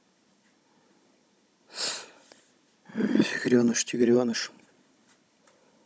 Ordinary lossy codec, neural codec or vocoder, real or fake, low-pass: none; codec, 16 kHz, 16 kbps, FunCodec, trained on Chinese and English, 50 frames a second; fake; none